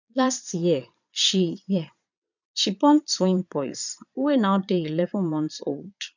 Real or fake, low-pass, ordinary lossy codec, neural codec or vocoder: fake; 7.2 kHz; none; vocoder, 22.05 kHz, 80 mel bands, Vocos